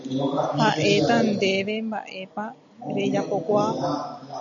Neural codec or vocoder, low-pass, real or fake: none; 7.2 kHz; real